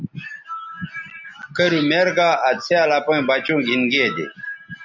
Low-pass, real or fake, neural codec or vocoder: 7.2 kHz; real; none